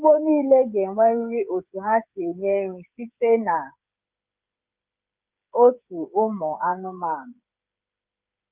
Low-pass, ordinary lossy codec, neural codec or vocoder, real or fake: 3.6 kHz; Opus, 32 kbps; codec, 16 kHz, 8 kbps, FreqCodec, smaller model; fake